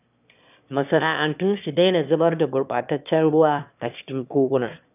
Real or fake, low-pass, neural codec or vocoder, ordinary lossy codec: fake; 3.6 kHz; autoencoder, 22.05 kHz, a latent of 192 numbers a frame, VITS, trained on one speaker; none